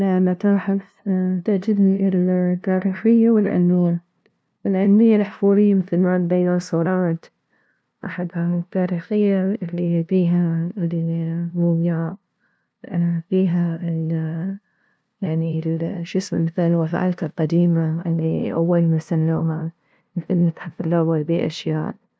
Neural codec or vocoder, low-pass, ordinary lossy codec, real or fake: codec, 16 kHz, 0.5 kbps, FunCodec, trained on LibriTTS, 25 frames a second; none; none; fake